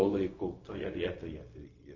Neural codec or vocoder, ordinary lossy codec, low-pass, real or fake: codec, 16 kHz, 0.4 kbps, LongCat-Audio-Codec; MP3, 32 kbps; 7.2 kHz; fake